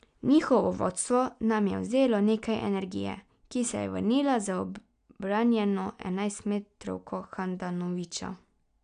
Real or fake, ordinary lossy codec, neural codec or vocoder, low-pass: real; none; none; 9.9 kHz